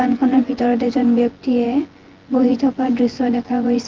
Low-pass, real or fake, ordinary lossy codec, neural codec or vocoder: 7.2 kHz; fake; Opus, 16 kbps; vocoder, 24 kHz, 100 mel bands, Vocos